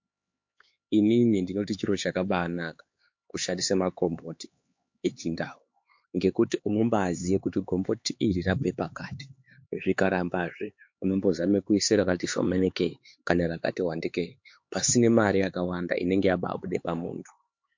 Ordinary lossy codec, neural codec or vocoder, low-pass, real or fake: MP3, 48 kbps; codec, 16 kHz, 4 kbps, X-Codec, HuBERT features, trained on LibriSpeech; 7.2 kHz; fake